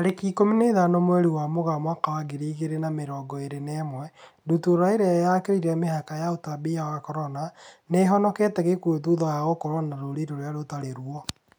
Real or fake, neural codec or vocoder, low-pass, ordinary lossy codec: real; none; none; none